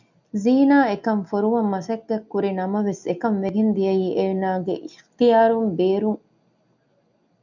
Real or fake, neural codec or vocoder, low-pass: real; none; 7.2 kHz